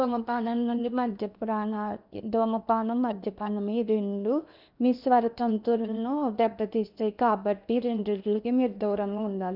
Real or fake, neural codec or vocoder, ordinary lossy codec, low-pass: fake; codec, 16 kHz in and 24 kHz out, 0.8 kbps, FocalCodec, streaming, 65536 codes; none; 5.4 kHz